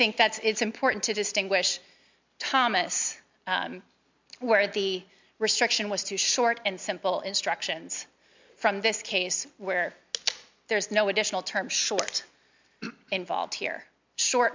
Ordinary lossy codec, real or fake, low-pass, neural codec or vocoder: MP3, 64 kbps; real; 7.2 kHz; none